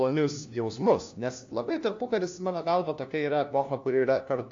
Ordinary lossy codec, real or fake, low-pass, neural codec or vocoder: AAC, 48 kbps; fake; 7.2 kHz; codec, 16 kHz, 1 kbps, FunCodec, trained on LibriTTS, 50 frames a second